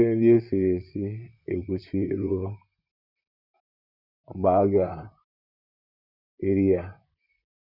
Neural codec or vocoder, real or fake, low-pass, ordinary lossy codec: vocoder, 44.1 kHz, 128 mel bands, Pupu-Vocoder; fake; 5.4 kHz; none